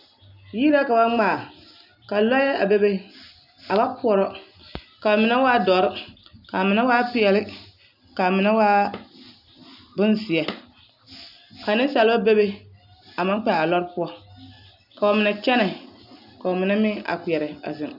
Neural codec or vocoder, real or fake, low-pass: none; real; 5.4 kHz